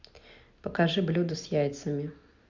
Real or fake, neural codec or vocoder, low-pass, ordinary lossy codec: real; none; 7.2 kHz; Opus, 64 kbps